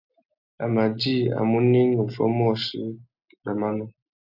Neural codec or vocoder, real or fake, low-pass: none; real; 5.4 kHz